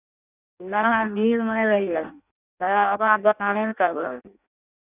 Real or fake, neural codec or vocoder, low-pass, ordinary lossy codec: fake; codec, 16 kHz in and 24 kHz out, 0.6 kbps, FireRedTTS-2 codec; 3.6 kHz; none